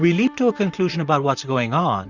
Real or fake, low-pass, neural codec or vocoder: fake; 7.2 kHz; vocoder, 44.1 kHz, 128 mel bands, Pupu-Vocoder